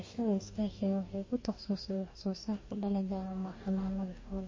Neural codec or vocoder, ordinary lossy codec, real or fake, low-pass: codec, 44.1 kHz, 2.6 kbps, DAC; MP3, 32 kbps; fake; 7.2 kHz